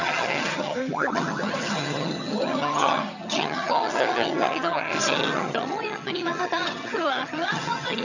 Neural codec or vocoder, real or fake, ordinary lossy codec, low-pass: vocoder, 22.05 kHz, 80 mel bands, HiFi-GAN; fake; none; 7.2 kHz